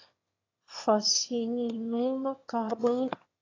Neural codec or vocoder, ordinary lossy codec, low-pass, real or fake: autoencoder, 22.05 kHz, a latent of 192 numbers a frame, VITS, trained on one speaker; AAC, 32 kbps; 7.2 kHz; fake